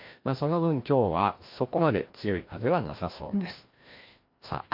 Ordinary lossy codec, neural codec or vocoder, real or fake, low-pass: MP3, 32 kbps; codec, 16 kHz, 1 kbps, FreqCodec, larger model; fake; 5.4 kHz